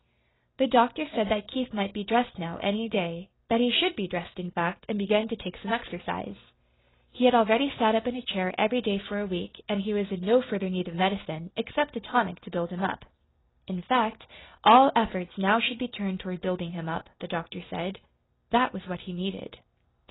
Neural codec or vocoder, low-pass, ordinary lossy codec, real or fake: none; 7.2 kHz; AAC, 16 kbps; real